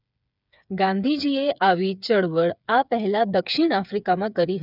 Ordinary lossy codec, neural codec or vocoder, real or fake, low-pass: none; codec, 16 kHz, 8 kbps, FreqCodec, smaller model; fake; 5.4 kHz